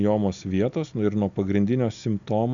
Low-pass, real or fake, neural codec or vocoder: 7.2 kHz; real; none